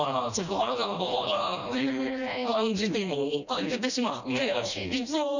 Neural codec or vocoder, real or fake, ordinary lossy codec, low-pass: codec, 16 kHz, 1 kbps, FreqCodec, smaller model; fake; none; 7.2 kHz